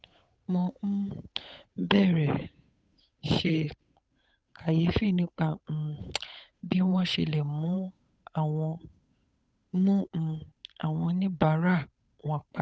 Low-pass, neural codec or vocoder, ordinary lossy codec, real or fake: none; codec, 16 kHz, 8 kbps, FunCodec, trained on Chinese and English, 25 frames a second; none; fake